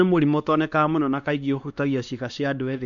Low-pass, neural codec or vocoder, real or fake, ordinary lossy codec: 7.2 kHz; codec, 16 kHz, 2 kbps, X-Codec, WavLM features, trained on Multilingual LibriSpeech; fake; none